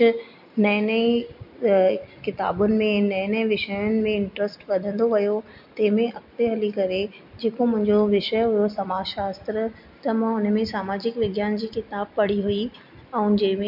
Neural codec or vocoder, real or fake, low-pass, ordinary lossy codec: none; real; 5.4 kHz; MP3, 48 kbps